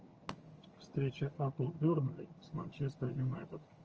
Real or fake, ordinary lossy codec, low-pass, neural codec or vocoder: fake; Opus, 24 kbps; 7.2 kHz; vocoder, 22.05 kHz, 80 mel bands, HiFi-GAN